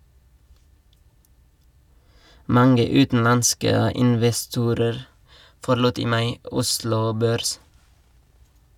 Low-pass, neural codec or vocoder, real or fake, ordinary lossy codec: 19.8 kHz; none; real; none